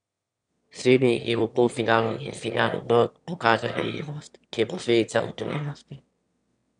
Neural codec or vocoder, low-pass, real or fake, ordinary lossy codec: autoencoder, 22.05 kHz, a latent of 192 numbers a frame, VITS, trained on one speaker; 9.9 kHz; fake; none